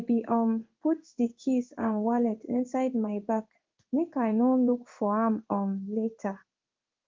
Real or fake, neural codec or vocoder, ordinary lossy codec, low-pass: fake; codec, 16 kHz in and 24 kHz out, 1 kbps, XY-Tokenizer; Opus, 24 kbps; 7.2 kHz